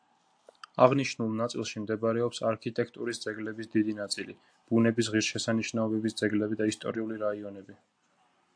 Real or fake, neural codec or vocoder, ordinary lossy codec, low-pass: real; none; AAC, 64 kbps; 9.9 kHz